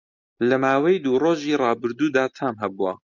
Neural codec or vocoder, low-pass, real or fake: none; 7.2 kHz; real